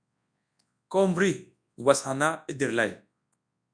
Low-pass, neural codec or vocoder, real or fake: 9.9 kHz; codec, 24 kHz, 0.9 kbps, WavTokenizer, large speech release; fake